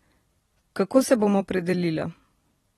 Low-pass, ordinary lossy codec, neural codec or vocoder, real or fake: 19.8 kHz; AAC, 32 kbps; vocoder, 44.1 kHz, 128 mel bands every 256 samples, BigVGAN v2; fake